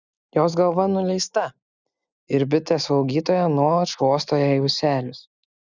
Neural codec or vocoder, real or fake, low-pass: none; real; 7.2 kHz